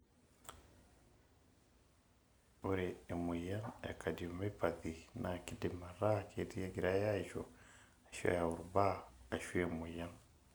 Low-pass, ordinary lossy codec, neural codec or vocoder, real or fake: none; none; none; real